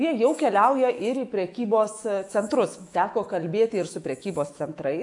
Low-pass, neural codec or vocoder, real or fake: 10.8 kHz; autoencoder, 48 kHz, 128 numbers a frame, DAC-VAE, trained on Japanese speech; fake